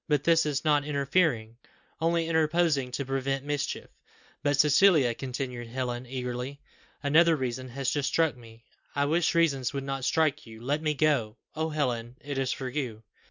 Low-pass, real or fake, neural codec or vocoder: 7.2 kHz; real; none